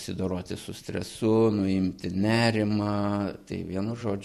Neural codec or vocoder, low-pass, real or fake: vocoder, 48 kHz, 128 mel bands, Vocos; 14.4 kHz; fake